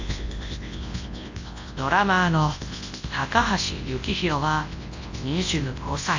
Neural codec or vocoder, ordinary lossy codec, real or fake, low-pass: codec, 24 kHz, 0.9 kbps, WavTokenizer, large speech release; AAC, 48 kbps; fake; 7.2 kHz